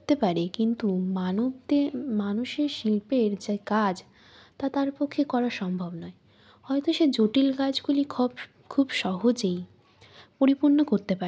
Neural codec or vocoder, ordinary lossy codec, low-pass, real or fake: none; none; none; real